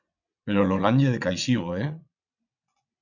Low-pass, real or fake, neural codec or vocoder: 7.2 kHz; fake; vocoder, 22.05 kHz, 80 mel bands, WaveNeXt